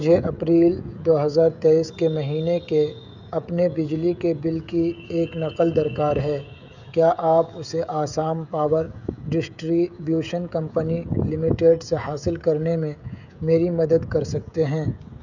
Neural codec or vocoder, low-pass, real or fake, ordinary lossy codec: codec, 16 kHz, 16 kbps, FreqCodec, smaller model; 7.2 kHz; fake; none